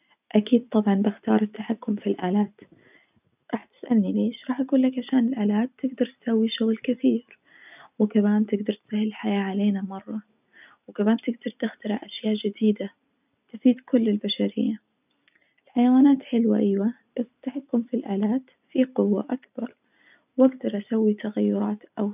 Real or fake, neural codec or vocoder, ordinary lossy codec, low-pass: real; none; none; 3.6 kHz